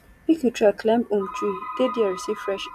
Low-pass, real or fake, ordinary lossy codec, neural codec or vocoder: 14.4 kHz; real; none; none